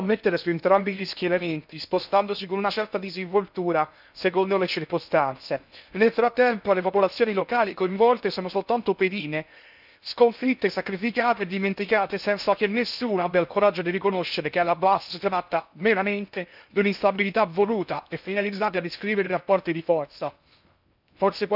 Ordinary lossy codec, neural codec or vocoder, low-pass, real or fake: none; codec, 16 kHz in and 24 kHz out, 0.6 kbps, FocalCodec, streaming, 2048 codes; 5.4 kHz; fake